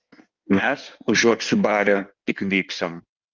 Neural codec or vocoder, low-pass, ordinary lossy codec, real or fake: codec, 16 kHz in and 24 kHz out, 1.1 kbps, FireRedTTS-2 codec; 7.2 kHz; Opus, 24 kbps; fake